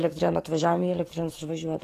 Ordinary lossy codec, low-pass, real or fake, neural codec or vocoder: AAC, 48 kbps; 14.4 kHz; fake; codec, 44.1 kHz, 7.8 kbps, DAC